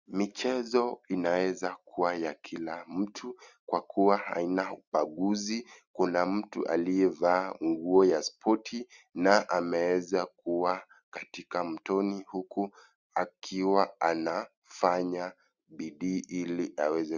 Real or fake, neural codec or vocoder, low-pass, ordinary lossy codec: real; none; 7.2 kHz; Opus, 64 kbps